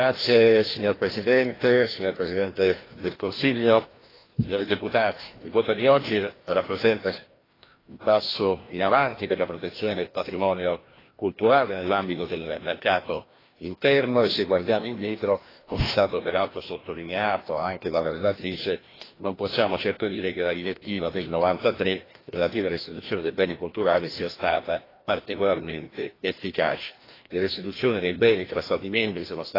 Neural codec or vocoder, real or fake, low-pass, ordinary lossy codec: codec, 16 kHz, 1 kbps, FreqCodec, larger model; fake; 5.4 kHz; AAC, 24 kbps